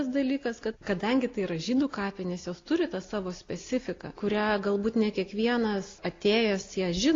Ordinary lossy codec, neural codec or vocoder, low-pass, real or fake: AAC, 32 kbps; none; 7.2 kHz; real